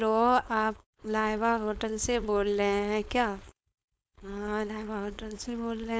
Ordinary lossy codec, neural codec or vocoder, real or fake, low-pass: none; codec, 16 kHz, 4.8 kbps, FACodec; fake; none